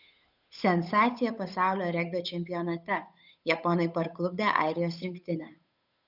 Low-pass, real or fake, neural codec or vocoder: 5.4 kHz; fake; codec, 16 kHz, 8 kbps, FunCodec, trained on Chinese and English, 25 frames a second